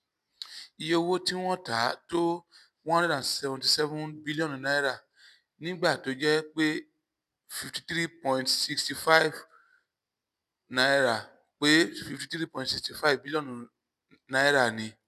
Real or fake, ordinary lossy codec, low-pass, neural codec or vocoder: real; none; 14.4 kHz; none